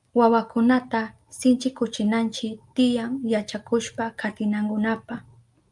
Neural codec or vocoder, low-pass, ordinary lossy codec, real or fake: none; 10.8 kHz; Opus, 32 kbps; real